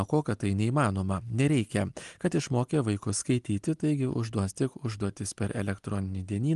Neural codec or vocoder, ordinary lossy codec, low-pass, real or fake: none; Opus, 24 kbps; 10.8 kHz; real